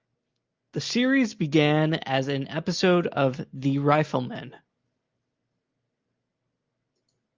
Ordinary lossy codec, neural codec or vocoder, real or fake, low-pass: Opus, 24 kbps; none; real; 7.2 kHz